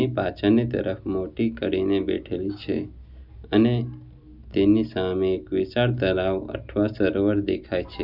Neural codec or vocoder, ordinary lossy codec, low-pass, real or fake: none; none; 5.4 kHz; real